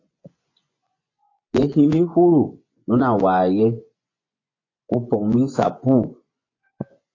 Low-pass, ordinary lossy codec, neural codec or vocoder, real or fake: 7.2 kHz; AAC, 32 kbps; vocoder, 24 kHz, 100 mel bands, Vocos; fake